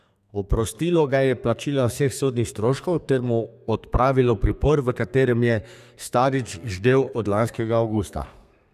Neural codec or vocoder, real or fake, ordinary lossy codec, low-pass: codec, 32 kHz, 1.9 kbps, SNAC; fake; none; 14.4 kHz